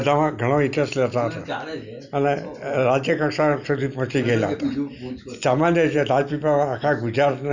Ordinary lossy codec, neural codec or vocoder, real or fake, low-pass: none; none; real; 7.2 kHz